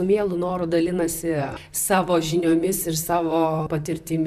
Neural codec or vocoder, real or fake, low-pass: vocoder, 44.1 kHz, 128 mel bands, Pupu-Vocoder; fake; 14.4 kHz